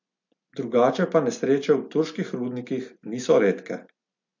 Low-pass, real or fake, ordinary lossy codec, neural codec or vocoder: 7.2 kHz; real; MP3, 48 kbps; none